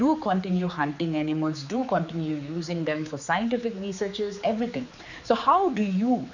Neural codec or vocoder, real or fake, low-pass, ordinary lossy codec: codec, 16 kHz, 4 kbps, X-Codec, HuBERT features, trained on general audio; fake; 7.2 kHz; none